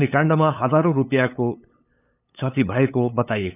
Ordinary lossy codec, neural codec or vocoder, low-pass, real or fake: none; codec, 16 kHz, 8 kbps, FunCodec, trained on LibriTTS, 25 frames a second; 3.6 kHz; fake